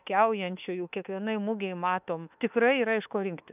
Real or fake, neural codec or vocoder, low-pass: fake; autoencoder, 48 kHz, 32 numbers a frame, DAC-VAE, trained on Japanese speech; 3.6 kHz